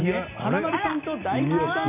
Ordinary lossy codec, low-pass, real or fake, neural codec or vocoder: none; 3.6 kHz; real; none